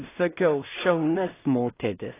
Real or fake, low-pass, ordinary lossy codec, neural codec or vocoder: fake; 3.6 kHz; AAC, 16 kbps; codec, 16 kHz in and 24 kHz out, 0.4 kbps, LongCat-Audio-Codec, two codebook decoder